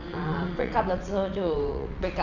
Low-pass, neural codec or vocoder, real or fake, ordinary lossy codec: 7.2 kHz; vocoder, 22.05 kHz, 80 mel bands, WaveNeXt; fake; none